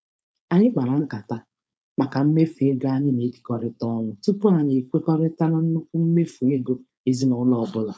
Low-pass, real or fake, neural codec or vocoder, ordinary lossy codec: none; fake; codec, 16 kHz, 4.8 kbps, FACodec; none